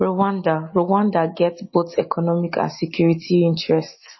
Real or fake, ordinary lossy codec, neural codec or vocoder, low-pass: real; MP3, 24 kbps; none; 7.2 kHz